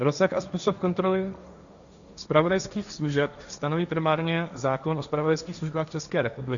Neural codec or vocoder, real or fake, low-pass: codec, 16 kHz, 1.1 kbps, Voila-Tokenizer; fake; 7.2 kHz